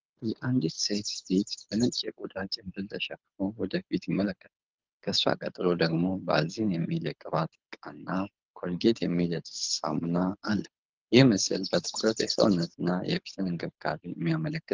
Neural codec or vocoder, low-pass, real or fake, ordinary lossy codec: codec, 24 kHz, 6 kbps, HILCodec; 7.2 kHz; fake; Opus, 32 kbps